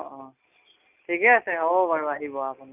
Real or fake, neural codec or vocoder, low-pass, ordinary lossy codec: real; none; 3.6 kHz; none